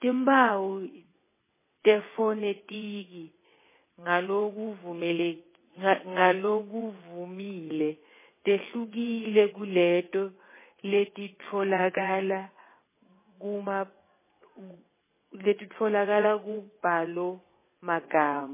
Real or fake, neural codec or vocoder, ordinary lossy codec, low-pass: fake; vocoder, 22.05 kHz, 80 mel bands, WaveNeXt; MP3, 16 kbps; 3.6 kHz